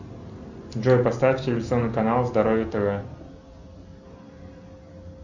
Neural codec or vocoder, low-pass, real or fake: none; 7.2 kHz; real